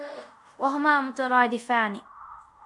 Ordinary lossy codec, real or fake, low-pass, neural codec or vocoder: MP3, 64 kbps; fake; 10.8 kHz; codec, 24 kHz, 0.5 kbps, DualCodec